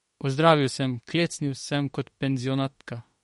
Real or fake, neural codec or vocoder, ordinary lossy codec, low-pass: fake; autoencoder, 48 kHz, 32 numbers a frame, DAC-VAE, trained on Japanese speech; MP3, 48 kbps; 19.8 kHz